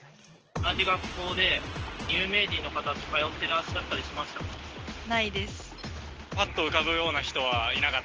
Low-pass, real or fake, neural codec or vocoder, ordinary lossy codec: 7.2 kHz; real; none; Opus, 16 kbps